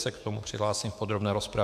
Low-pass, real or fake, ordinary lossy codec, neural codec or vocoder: 14.4 kHz; fake; MP3, 96 kbps; autoencoder, 48 kHz, 128 numbers a frame, DAC-VAE, trained on Japanese speech